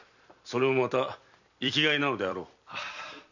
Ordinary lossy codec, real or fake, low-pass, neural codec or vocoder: none; fake; 7.2 kHz; vocoder, 44.1 kHz, 128 mel bands every 256 samples, BigVGAN v2